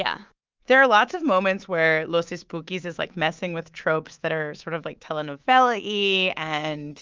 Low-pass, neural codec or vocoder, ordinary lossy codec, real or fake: 7.2 kHz; codec, 24 kHz, 3.1 kbps, DualCodec; Opus, 24 kbps; fake